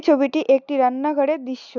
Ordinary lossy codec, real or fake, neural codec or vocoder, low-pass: none; real; none; 7.2 kHz